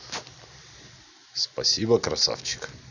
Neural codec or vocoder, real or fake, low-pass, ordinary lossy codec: none; real; 7.2 kHz; none